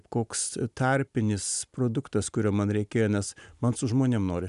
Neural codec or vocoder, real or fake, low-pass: none; real; 10.8 kHz